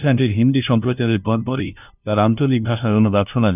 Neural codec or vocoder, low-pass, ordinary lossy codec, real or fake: codec, 16 kHz, 0.5 kbps, FunCodec, trained on LibriTTS, 25 frames a second; 3.6 kHz; none; fake